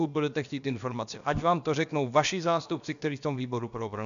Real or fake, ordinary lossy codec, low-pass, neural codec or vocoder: fake; MP3, 96 kbps; 7.2 kHz; codec, 16 kHz, about 1 kbps, DyCAST, with the encoder's durations